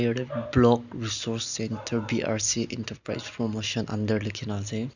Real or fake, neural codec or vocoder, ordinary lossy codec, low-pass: real; none; none; 7.2 kHz